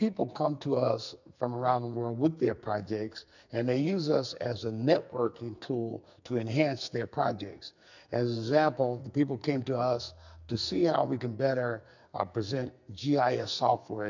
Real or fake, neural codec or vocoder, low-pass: fake; codec, 44.1 kHz, 2.6 kbps, SNAC; 7.2 kHz